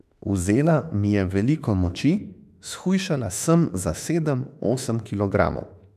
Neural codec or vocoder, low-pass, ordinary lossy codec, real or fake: autoencoder, 48 kHz, 32 numbers a frame, DAC-VAE, trained on Japanese speech; 14.4 kHz; none; fake